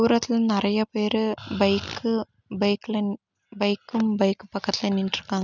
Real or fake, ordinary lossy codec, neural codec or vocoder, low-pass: real; none; none; 7.2 kHz